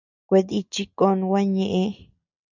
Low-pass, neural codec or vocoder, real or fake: 7.2 kHz; none; real